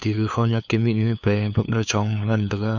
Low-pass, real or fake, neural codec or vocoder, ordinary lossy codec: 7.2 kHz; fake; codec, 16 kHz, 2 kbps, FunCodec, trained on LibriTTS, 25 frames a second; none